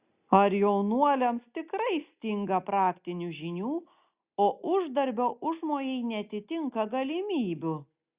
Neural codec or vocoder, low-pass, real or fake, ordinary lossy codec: none; 3.6 kHz; real; Opus, 64 kbps